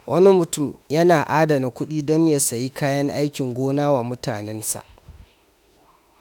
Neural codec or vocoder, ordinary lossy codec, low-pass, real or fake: autoencoder, 48 kHz, 32 numbers a frame, DAC-VAE, trained on Japanese speech; none; 19.8 kHz; fake